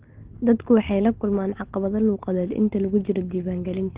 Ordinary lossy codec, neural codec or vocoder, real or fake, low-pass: Opus, 16 kbps; none; real; 3.6 kHz